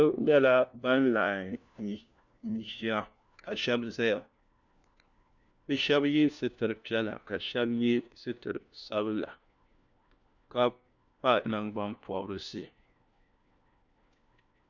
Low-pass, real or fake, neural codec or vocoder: 7.2 kHz; fake; codec, 16 kHz, 1 kbps, FunCodec, trained on LibriTTS, 50 frames a second